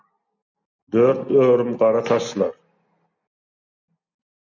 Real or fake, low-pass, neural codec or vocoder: real; 7.2 kHz; none